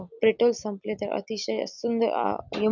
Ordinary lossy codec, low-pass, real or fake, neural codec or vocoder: none; 7.2 kHz; real; none